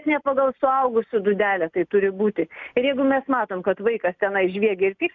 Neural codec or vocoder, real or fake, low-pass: none; real; 7.2 kHz